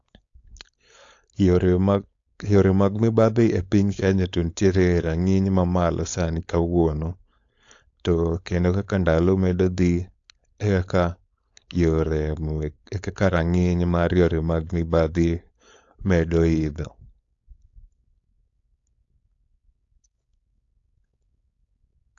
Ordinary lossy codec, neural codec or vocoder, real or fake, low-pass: AAC, 48 kbps; codec, 16 kHz, 4.8 kbps, FACodec; fake; 7.2 kHz